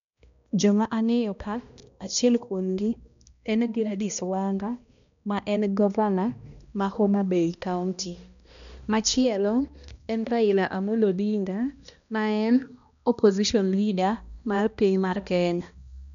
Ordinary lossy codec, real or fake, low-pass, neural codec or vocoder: none; fake; 7.2 kHz; codec, 16 kHz, 1 kbps, X-Codec, HuBERT features, trained on balanced general audio